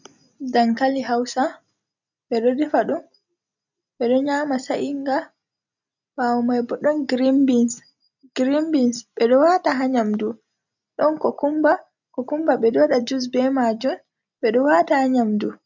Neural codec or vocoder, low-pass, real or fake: none; 7.2 kHz; real